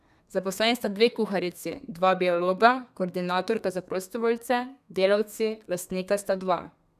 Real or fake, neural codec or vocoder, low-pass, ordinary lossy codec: fake; codec, 32 kHz, 1.9 kbps, SNAC; 14.4 kHz; none